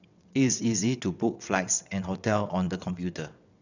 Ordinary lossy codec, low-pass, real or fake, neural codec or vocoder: none; 7.2 kHz; fake; vocoder, 22.05 kHz, 80 mel bands, WaveNeXt